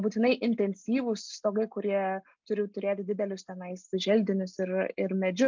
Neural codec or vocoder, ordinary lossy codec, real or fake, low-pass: none; MP3, 64 kbps; real; 7.2 kHz